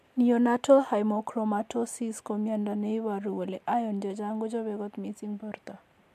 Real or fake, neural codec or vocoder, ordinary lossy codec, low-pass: real; none; MP3, 96 kbps; 14.4 kHz